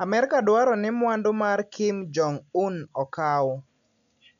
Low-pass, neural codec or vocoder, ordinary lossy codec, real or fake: 7.2 kHz; none; none; real